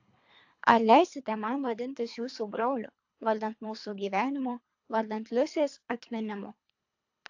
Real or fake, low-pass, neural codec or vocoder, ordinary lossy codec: fake; 7.2 kHz; codec, 24 kHz, 3 kbps, HILCodec; AAC, 48 kbps